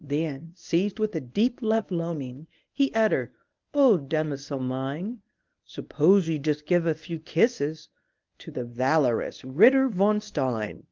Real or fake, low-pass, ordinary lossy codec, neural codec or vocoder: fake; 7.2 kHz; Opus, 24 kbps; codec, 24 kHz, 0.9 kbps, WavTokenizer, medium speech release version 1